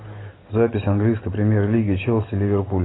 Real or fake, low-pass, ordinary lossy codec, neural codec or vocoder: real; 7.2 kHz; AAC, 16 kbps; none